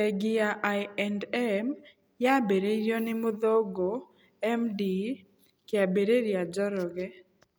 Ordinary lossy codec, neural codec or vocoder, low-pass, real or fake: none; none; none; real